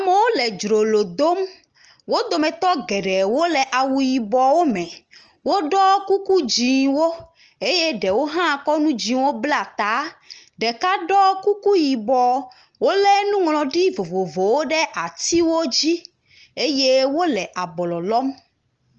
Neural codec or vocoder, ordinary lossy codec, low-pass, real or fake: none; Opus, 24 kbps; 7.2 kHz; real